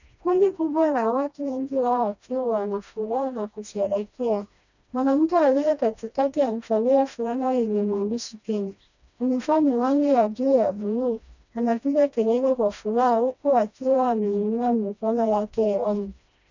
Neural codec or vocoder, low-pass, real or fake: codec, 16 kHz, 1 kbps, FreqCodec, smaller model; 7.2 kHz; fake